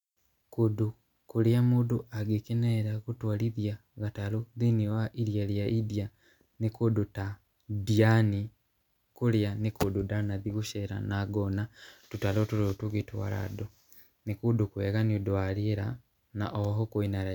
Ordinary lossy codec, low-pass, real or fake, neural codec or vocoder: none; 19.8 kHz; real; none